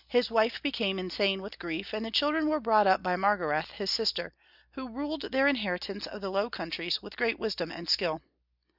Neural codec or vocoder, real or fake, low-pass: none; real; 5.4 kHz